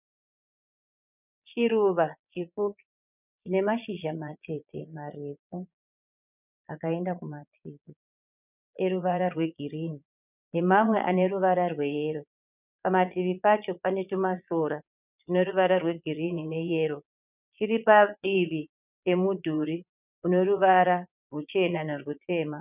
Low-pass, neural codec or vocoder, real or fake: 3.6 kHz; vocoder, 22.05 kHz, 80 mel bands, Vocos; fake